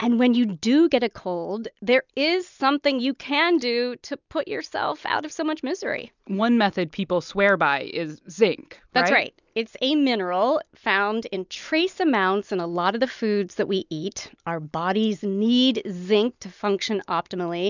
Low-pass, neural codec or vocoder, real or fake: 7.2 kHz; none; real